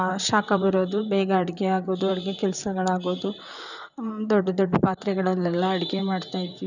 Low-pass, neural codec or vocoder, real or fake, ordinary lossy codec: 7.2 kHz; vocoder, 44.1 kHz, 128 mel bands, Pupu-Vocoder; fake; none